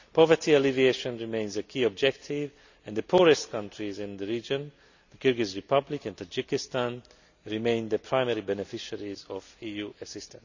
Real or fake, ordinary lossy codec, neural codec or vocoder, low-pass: real; none; none; 7.2 kHz